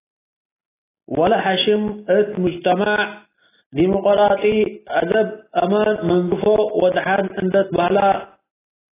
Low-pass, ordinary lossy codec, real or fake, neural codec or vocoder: 3.6 kHz; AAC, 16 kbps; real; none